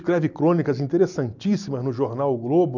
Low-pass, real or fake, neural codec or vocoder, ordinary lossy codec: 7.2 kHz; real; none; none